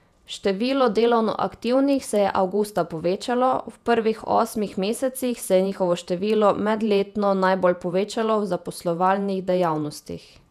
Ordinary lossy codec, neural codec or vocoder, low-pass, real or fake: none; vocoder, 48 kHz, 128 mel bands, Vocos; 14.4 kHz; fake